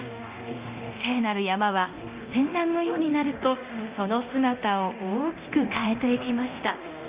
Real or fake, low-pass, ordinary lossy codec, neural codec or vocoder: fake; 3.6 kHz; Opus, 64 kbps; codec, 24 kHz, 0.9 kbps, DualCodec